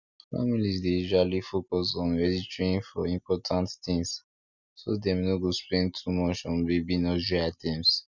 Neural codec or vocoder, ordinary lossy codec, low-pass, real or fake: none; none; 7.2 kHz; real